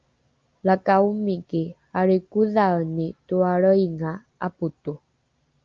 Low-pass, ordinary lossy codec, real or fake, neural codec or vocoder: 7.2 kHz; Opus, 24 kbps; real; none